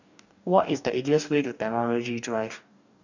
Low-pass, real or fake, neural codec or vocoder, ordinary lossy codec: 7.2 kHz; fake; codec, 44.1 kHz, 2.6 kbps, DAC; none